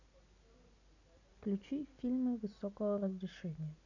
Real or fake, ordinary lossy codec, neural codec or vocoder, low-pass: real; none; none; 7.2 kHz